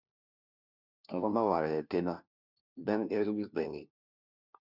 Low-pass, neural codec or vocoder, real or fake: 5.4 kHz; codec, 16 kHz, 1 kbps, FunCodec, trained on LibriTTS, 50 frames a second; fake